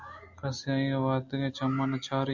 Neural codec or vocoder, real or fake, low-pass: none; real; 7.2 kHz